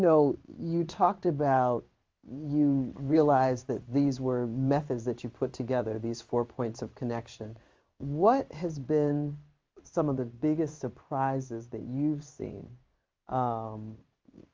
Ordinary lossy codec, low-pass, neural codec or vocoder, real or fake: Opus, 32 kbps; 7.2 kHz; codec, 16 kHz in and 24 kHz out, 1 kbps, XY-Tokenizer; fake